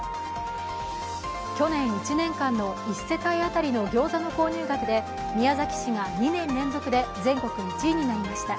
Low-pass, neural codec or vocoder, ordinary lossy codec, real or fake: none; none; none; real